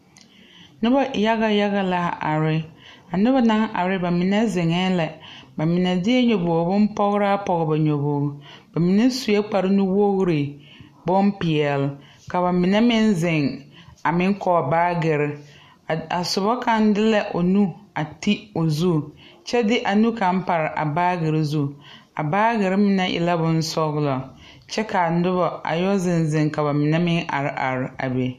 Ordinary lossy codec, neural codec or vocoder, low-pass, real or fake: MP3, 64 kbps; none; 14.4 kHz; real